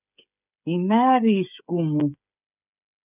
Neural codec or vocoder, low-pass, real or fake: codec, 16 kHz, 8 kbps, FreqCodec, smaller model; 3.6 kHz; fake